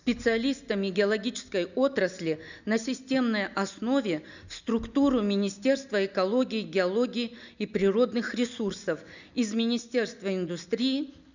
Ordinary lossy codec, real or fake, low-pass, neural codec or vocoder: none; real; 7.2 kHz; none